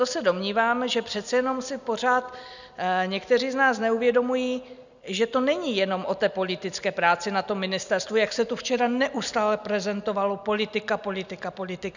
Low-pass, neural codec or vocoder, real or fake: 7.2 kHz; none; real